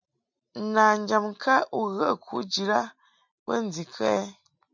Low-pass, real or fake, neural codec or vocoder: 7.2 kHz; real; none